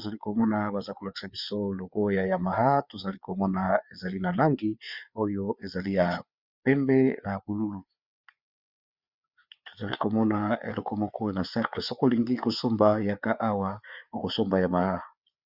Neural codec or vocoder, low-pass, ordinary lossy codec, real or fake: codec, 16 kHz, 4 kbps, FreqCodec, larger model; 5.4 kHz; Opus, 64 kbps; fake